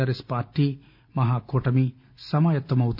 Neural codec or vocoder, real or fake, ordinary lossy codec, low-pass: none; real; none; 5.4 kHz